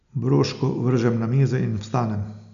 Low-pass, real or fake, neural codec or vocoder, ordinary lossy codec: 7.2 kHz; real; none; none